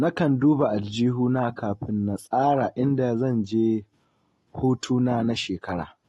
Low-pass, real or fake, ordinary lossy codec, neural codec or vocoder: 14.4 kHz; real; AAC, 32 kbps; none